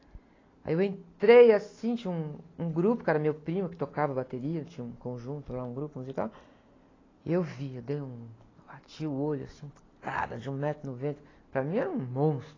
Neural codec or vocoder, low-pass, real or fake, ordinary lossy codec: none; 7.2 kHz; real; AAC, 32 kbps